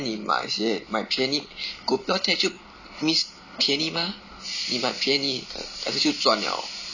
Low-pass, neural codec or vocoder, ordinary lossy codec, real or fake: 7.2 kHz; none; none; real